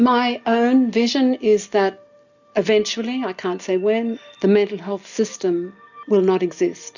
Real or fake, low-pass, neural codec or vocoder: real; 7.2 kHz; none